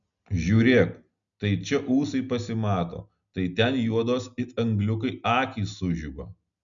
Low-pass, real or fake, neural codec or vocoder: 7.2 kHz; real; none